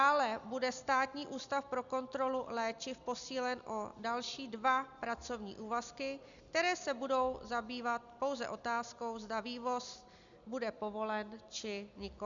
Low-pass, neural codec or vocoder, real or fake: 7.2 kHz; none; real